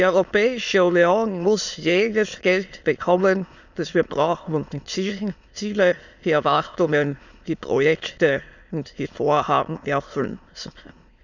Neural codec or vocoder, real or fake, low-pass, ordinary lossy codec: autoencoder, 22.05 kHz, a latent of 192 numbers a frame, VITS, trained on many speakers; fake; 7.2 kHz; none